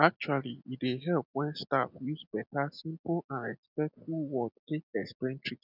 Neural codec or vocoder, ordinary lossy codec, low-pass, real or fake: none; none; 5.4 kHz; real